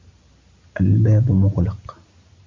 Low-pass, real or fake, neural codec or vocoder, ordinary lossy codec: 7.2 kHz; real; none; MP3, 64 kbps